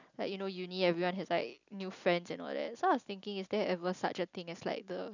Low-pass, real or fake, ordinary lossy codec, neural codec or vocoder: 7.2 kHz; real; none; none